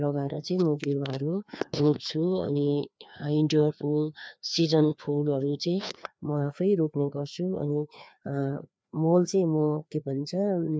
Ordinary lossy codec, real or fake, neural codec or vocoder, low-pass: none; fake; codec, 16 kHz, 2 kbps, FreqCodec, larger model; none